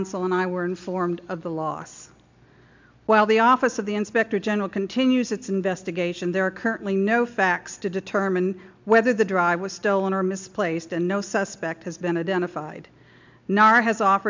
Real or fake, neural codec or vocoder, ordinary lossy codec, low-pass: real; none; MP3, 64 kbps; 7.2 kHz